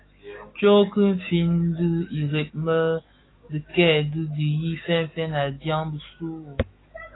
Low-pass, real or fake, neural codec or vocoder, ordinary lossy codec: 7.2 kHz; fake; autoencoder, 48 kHz, 128 numbers a frame, DAC-VAE, trained on Japanese speech; AAC, 16 kbps